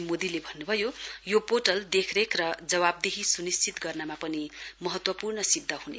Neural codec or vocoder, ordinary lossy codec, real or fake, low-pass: none; none; real; none